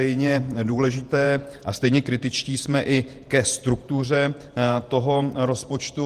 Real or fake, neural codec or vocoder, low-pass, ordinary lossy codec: fake; vocoder, 48 kHz, 128 mel bands, Vocos; 14.4 kHz; Opus, 24 kbps